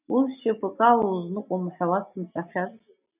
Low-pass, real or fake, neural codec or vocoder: 3.6 kHz; real; none